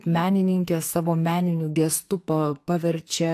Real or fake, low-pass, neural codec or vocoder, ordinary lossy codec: fake; 14.4 kHz; codec, 44.1 kHz, 2.6 kbps, SNAC; AAC, 64 kbps